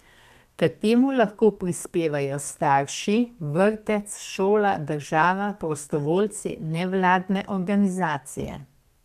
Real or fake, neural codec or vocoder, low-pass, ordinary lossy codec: fake; codec, 32 kHz, 1.9 kbps, SNAC; 14.4 kHz; MP3, 96 kbps